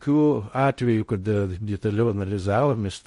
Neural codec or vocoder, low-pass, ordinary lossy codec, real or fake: codec, 16 kHz in and 24 kHz out, 0.6 kbps, FocalCodec, streaming, 2048 codes; 10.8 kHz; MP3, 48 kbps; fake